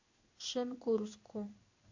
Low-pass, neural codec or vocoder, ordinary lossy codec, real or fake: 7.2 kHz; codec, 16 kHz in and 24 kHz out, 1 kbps, XY-Tokenizer; AAC, 48 kbps; fake